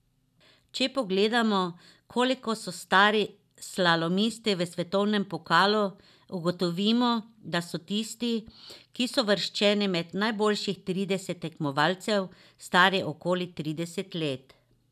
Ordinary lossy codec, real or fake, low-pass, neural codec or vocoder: none; real; 14.4 kHz; none